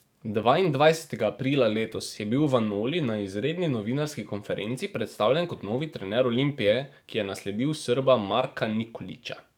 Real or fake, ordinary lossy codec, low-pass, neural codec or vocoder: fake; none; 19.8 kHz; codec, 44.1 kHz, 7.8 kbps, DAC